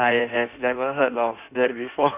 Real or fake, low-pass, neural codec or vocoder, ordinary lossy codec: fake; 3.6 kHz; codec, 16 kHz in and 24 kHz out, 1.1 kbps, FireRedTTS-2 codec; none